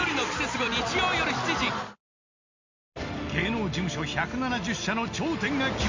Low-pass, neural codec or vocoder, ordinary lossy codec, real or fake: 7.2 kHz; none; MP3, 64 kbps; real